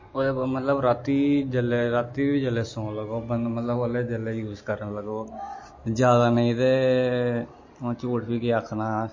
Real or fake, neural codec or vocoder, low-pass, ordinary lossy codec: real; none; 7.2 kHz; MP3, 32 kbps